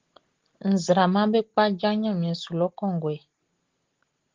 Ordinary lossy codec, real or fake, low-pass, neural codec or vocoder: Opus, 16 kbps; real; 7.2 kHz; none